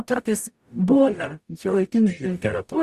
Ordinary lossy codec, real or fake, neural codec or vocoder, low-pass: Opus, 64 kbps; fake; codec, 44.1 kHz, 0.9 kbps, DAC; 14.4 kHz